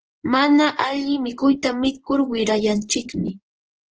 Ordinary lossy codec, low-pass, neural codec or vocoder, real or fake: Opus, 16 kbps; 7.2 kHz; codec, 16 kHz in and 24 kHz out, 1 kbps, XY-Tokenizer; fake